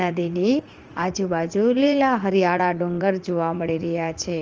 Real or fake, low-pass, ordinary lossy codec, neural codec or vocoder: fake; 7.2 kHz; Opus, 24 kbps; vocoder, 44.1 kHz, 80 mel bands, Vocos